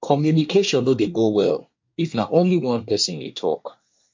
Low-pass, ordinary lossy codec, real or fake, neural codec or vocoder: 7.2 kHz; MP3, 48 kbps; fake; codec, 24 kHz, 1 kbps, SNAC